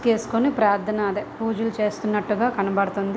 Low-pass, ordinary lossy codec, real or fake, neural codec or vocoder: none; none; real; none